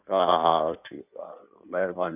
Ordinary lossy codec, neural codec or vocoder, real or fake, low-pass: none; codec, 16 kHz in and 24 kHz out, 1.1 kbps, FireRedTTS-2 codec; fake; 3.6 kHz